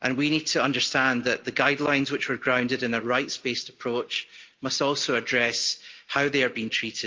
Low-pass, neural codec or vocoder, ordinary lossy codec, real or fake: 7.2 kHz; none; Opus, 24 kbps; real